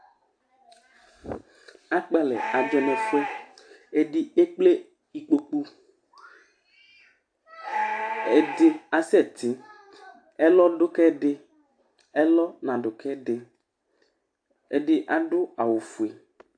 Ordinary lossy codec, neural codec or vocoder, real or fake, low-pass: AAC, 64 kbps; none; real; 9.9 kHz